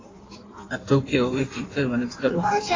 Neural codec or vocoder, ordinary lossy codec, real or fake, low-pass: codec, 16 kHz in and 24 kHz out, 1.1 kbps, FireRedTTS-2 codec; AAC, 32 kbps; fake; 7.2 kHz